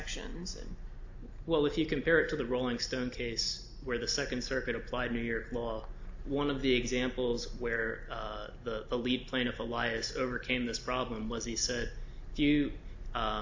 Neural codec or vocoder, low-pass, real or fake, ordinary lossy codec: none; 7.2 kHz; real; AAC, 48 kbps